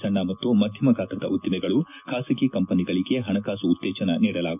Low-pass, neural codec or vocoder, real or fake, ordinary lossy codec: 3.6 kHz; none; real; none